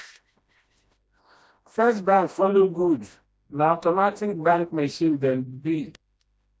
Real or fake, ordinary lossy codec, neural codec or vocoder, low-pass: fake; none; codec, 16 kHz, 1 kbps, FreqCodec, smaller model; none